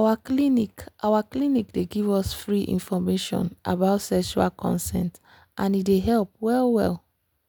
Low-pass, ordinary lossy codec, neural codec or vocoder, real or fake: none; none; none; real